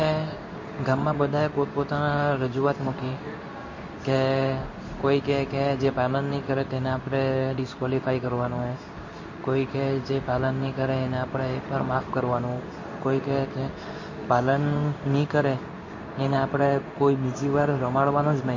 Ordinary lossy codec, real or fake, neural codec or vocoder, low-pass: MP3, 32 kbps; fake; codec, 16 kHz in and 24 kHz out, 1 kbps, XY-Tokenizer; 7.2 kHz